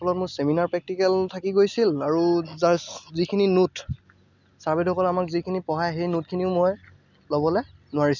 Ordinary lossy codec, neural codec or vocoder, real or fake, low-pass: none; none; real; 7.2 kHz